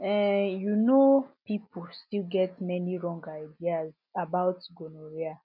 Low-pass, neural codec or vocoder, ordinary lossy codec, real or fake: 5.4 kHz; none; none; real